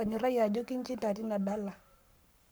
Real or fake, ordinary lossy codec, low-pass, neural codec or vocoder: fake; none; none; codec, 44.1 kHz, 7.8 kbps, Pupu-Codec